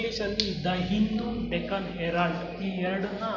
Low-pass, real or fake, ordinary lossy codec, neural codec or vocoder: 7.2 kHz; real; none; none